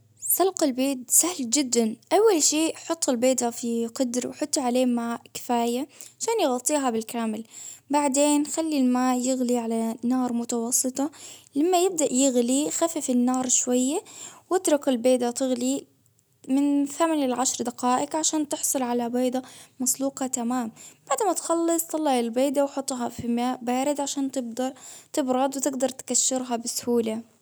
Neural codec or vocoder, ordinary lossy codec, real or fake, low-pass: none; none; real; none